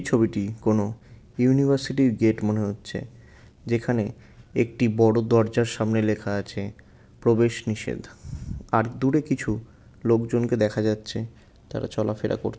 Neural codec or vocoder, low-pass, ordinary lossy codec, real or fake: none; none; none; real